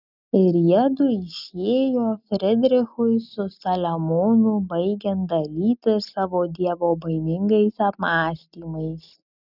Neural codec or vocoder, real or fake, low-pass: none; real; 5.4 kHz